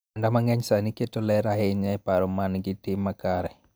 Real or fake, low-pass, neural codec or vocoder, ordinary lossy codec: real; none; none; none